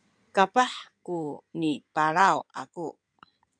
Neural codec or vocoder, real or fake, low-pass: codec, 16 kHz in and 24 kHz out, 2.2 kbps, FireRedTTS-2 codec; fake; 9.9 kHz